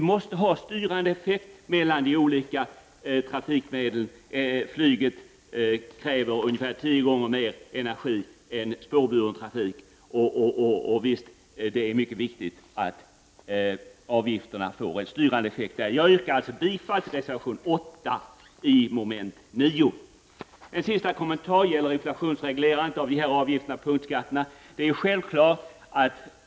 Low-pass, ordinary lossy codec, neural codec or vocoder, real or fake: none; none; none; real